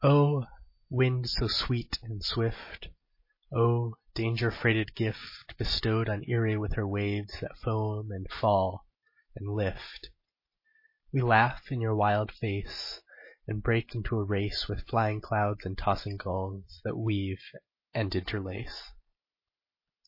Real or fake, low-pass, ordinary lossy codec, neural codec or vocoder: real; 5.4 kHz; MP3, 24 kbps; none